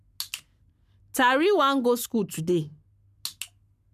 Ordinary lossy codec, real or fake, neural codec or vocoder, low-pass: none; fake; codec, 44.1 kHz, 7.8 kbps, Pupu-Codec; 14.4 kHz